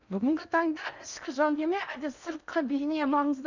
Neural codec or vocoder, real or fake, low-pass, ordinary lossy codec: codec, 16 kHz in and 24 kHz out, 0.8 kbps, FocalCodec, streaming, 65536 codes; fake; 7.2 kHz; none